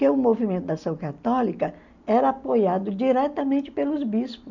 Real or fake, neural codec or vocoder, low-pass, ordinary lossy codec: real; none; 7.2 kHz; none